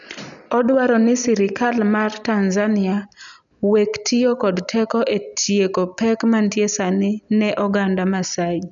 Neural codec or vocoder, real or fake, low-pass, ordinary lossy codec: none; real; 7.2 kHz; none